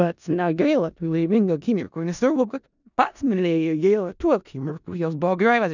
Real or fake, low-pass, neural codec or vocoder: fake; 7.2 kHz; codec, 16 kHz in and 24 kHz out, 0.4 kbps, LongCat-Audio-Codec, four codebook decoder